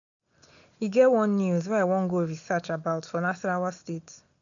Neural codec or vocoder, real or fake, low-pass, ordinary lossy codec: none; real; 7.2 kHz; none